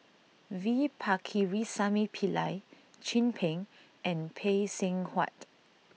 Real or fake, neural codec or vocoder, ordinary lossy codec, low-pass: real; none; none; none